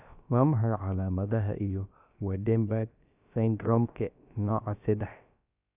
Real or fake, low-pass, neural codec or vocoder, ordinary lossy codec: fake; 3.6 kHz; codec, 16 kHz, about 1 kbps, DyCAST, with the encoder's durations; none